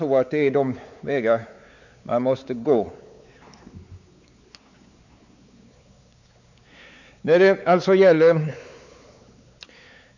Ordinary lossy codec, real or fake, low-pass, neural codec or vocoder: none; fake; 7.2 kHz; codec, 16 kHz, 4 kbps, X-Codec, WavLM features, trained on Multilingual LibriSpeech